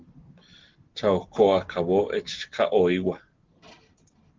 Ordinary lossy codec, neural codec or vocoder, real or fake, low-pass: Opus, 16 kbps; none; real; 7.2 kHz